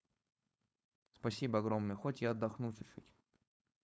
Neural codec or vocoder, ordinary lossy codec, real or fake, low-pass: codec, 16 kHz, 4.8 kbps, FACodec; none; fake; none